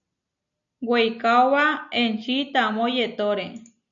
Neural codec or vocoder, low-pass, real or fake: none; 7.2 kHz; real